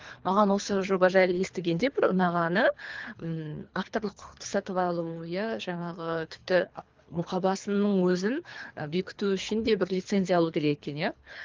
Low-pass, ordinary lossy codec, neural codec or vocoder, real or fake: 7.2 kHz; Opus, 32 kbps; codec, 24 kHz, 3 kbps, HILCodec; fake